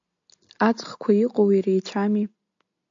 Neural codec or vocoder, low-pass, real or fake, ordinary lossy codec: none; 7.2 kHz; real; AAC, 64 kbps